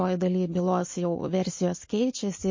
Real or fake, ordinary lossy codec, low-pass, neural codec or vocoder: real; MP3, 32 kbps; 7.2 kHz; none